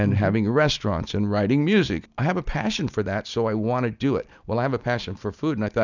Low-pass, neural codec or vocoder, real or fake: 7.2 kHz; none; real